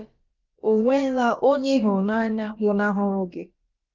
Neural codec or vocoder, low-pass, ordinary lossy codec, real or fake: codec, 16 kHz, about 1 kbps, DyCAST, with the encoder's durations; 7.2 kHz; Opus, 32 kbps; fake